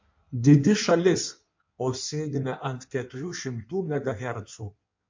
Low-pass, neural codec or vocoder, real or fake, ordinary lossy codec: 7.2 kHz; codec, 16 kHz in and 24 kHz out, 1.1 kbps, FireRedTTS-2 codec; fake; MP3, 48 kbps